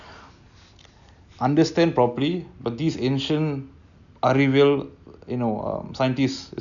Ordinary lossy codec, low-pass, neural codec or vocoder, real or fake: none; 7.2 kHz; none; real